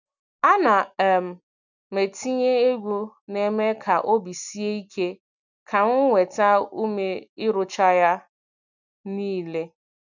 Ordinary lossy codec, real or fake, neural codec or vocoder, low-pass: none; real; none; 7.2 kHz